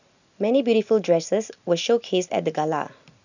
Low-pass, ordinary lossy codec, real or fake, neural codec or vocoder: 7.2 kHz; none; real; none